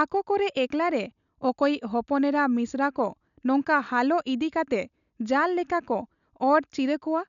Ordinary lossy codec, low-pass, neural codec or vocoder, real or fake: none; 7.2 kHz; none; real